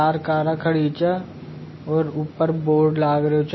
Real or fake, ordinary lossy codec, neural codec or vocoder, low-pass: real; MP3, 24 kbps; none; 7.2 kHz